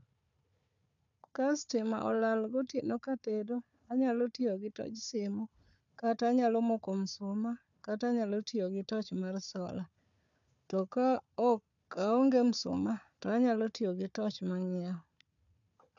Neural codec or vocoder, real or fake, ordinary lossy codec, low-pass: codec, 16 kHz, 4 kbps, FunCodec, trained on Chinese and English, 50 frames a second; fake; none; 7.2 kHz